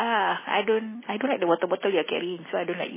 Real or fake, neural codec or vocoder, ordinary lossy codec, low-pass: real; none; MP3, 16 kbps; 3.6 kHz